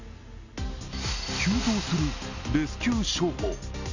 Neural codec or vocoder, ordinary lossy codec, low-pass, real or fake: none; none; 7.2 kHz; real